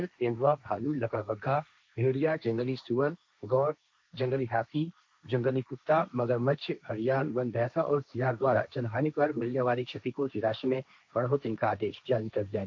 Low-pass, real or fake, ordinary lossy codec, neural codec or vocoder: none; fake; none; codec, 16 kHz, 1.1 kbps, Voila-Tokenizer